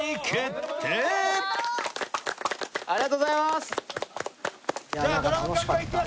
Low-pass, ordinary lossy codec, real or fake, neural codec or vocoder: none; none; real; none